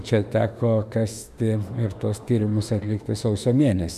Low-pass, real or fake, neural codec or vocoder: 14.4 kHz; fake; autoencoder, 48 kHz, 32 numbers a frame, DAC-VAE, trained on Japanese speech